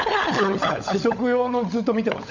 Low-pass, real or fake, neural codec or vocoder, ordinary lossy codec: 7.2 kHz; fake; codec, 16 kHz, 16 kbps, FunCodec, trained on LibriTTS, 50 frames a second; none